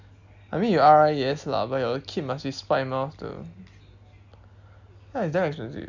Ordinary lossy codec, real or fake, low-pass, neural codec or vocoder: Opus, 64 kbps; real; 7.2 kHz; none